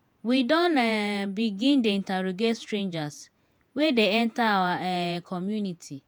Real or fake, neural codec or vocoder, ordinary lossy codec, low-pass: fake; vocoder, 48 kHz, 128 mel bands, Vocos; none; none